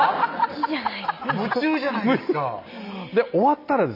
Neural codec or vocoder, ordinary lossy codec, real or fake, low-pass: none; none; real; 5.4 kHz